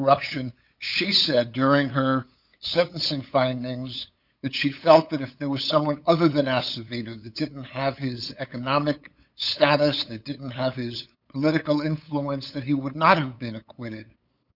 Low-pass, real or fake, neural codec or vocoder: 5.4 kHz; fake; codec, 16 kHz, 8 kbps, FunCodec, trained on LibriTTS, 25 frames a second